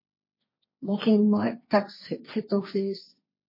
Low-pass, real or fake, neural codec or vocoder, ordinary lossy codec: 5.4 kHz; fake; codec, 16 kHz, 1.1 kbps, Voila-Tokenizer; MP3, 24 kbps